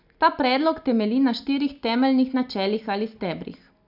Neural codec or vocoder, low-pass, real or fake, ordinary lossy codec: none; 5.4 kHz; real; none